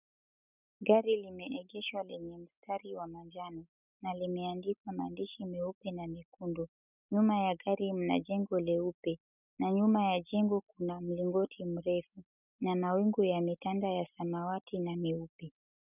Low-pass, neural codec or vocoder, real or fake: 3.6 kHz; none; real